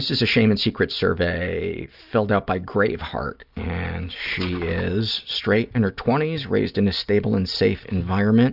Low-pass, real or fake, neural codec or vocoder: 5.4 kHz; real; none